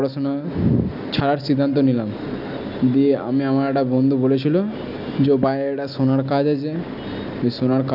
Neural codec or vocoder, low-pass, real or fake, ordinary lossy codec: none; 5.4 kHz; real; none